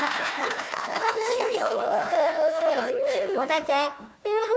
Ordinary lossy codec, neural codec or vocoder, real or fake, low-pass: none; codec, 16 kHz, 1 kbps, FunCodec, trained on Chinese and English, 50 frames a second; fake; none